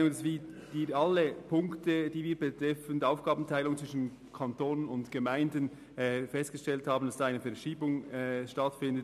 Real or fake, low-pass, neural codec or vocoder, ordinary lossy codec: real; 14.4 kHz; none; MP3, 96 kbps